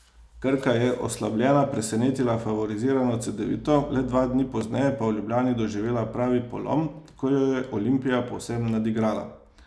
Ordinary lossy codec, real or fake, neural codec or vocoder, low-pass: none; real; none; none